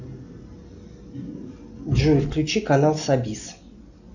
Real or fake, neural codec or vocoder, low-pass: real; none; 7.2 kHz